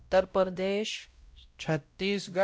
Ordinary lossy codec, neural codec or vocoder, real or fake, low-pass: none; codec, 16 kHz, 0.5 kbps, X-Codec, WavLM features, trained on Multilingual LibriSpeech; fake; none